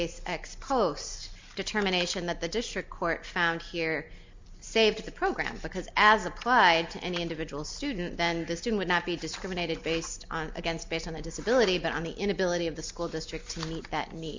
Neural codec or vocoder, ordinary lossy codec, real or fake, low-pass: none; MP3, 64 kbps; real; 7.2 kHz